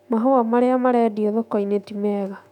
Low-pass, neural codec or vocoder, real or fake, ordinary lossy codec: 19.8 kHz; autoencoder, 48 kHz, 128 numbers a frame, DAC-VAE, trained on Japanese speech; fake; none